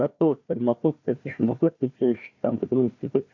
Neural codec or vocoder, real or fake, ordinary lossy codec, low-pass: codec, 16 kHz, 1 kbps, FunCodec, trained on Chinese and English, 50 frames a second; fake; MP3, 48 kbps; 7.2 kHz